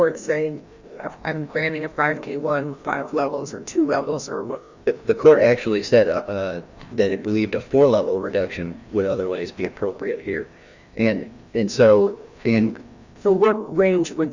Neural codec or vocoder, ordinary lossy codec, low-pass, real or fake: codec, 16 kHz, 1 kbps, FreqCodec, larger model; Opus, 64 kbps; 7.2 kHz; fake